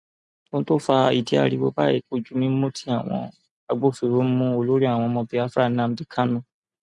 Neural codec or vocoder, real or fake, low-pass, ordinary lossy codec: none; real; 10.8 kHz; none